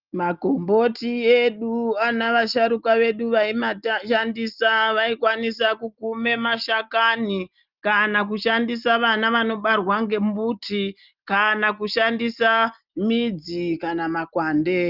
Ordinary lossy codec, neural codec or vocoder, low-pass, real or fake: Opus, 24 kbps; none; 5.4 kHz; real